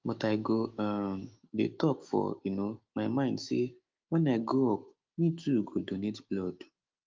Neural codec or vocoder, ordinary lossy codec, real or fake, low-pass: autoencoder, 48 kHz, 128 numbers a frame, DAC-VAE, trained on Japanese speech; Opus, 32 kbps; fake; 7.2 kHz